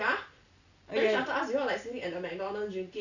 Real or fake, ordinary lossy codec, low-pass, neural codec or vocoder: real; none; 7.2 kHz; none